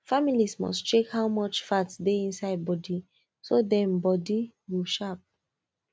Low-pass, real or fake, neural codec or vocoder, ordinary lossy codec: none; real; none; none